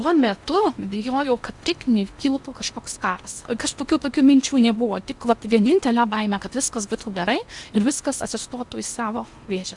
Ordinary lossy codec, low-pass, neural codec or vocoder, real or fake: Opus, 64 kbps; 10.8 kHz; codec, 16 kHz in and 24 kHz out, 0.8 kbps, FocalCodec, streaming, 65536 codes; fake